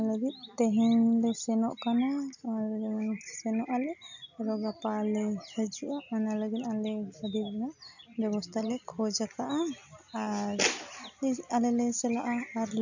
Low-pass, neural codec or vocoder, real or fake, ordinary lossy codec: 7.2 kHz; none; real; none